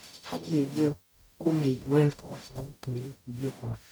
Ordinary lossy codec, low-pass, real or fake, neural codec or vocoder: none; none; fake; codec, 44.1 kHz, 0.9 kbps, DAC